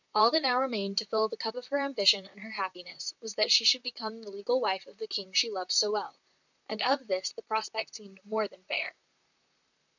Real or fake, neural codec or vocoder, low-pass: fake; vocoder, 44.1 kHz, 128 mel bands, Pupu-Vocoder; 7.2 kHz